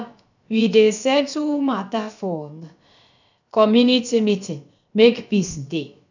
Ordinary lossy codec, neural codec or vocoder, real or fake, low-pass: none; codec, 16 kHz, about 1 kbps, DyCAST, with the encoder's durations; fake; 7.2 kHz